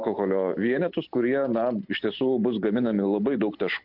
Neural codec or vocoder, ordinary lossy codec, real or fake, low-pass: none; MP3, 48 kbps; real; 5.4 kHz